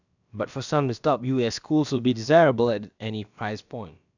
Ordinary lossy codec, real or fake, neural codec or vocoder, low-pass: none; fake; codec, 16 kHz, about 1 kbps, DyCAST, with the encoder's durations; 7.2 kHz